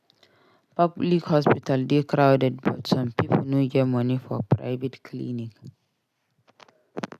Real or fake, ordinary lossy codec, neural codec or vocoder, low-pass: real; none; none; 14.4 kHz